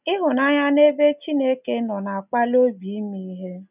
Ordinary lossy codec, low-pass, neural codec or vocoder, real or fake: none; 3.6 kHz; none; real